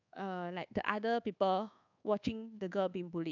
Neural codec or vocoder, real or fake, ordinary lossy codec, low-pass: codec, 24 kHz, 1.2 kbps, DualCodec; fake; none; 7.2 kHz